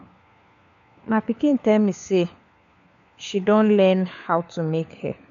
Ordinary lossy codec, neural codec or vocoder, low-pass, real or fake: none; codec, 16 kHz, 4 kbps, FunCodec, trained on LibriTTS, 50 frames a second; 7.2 kHz; fake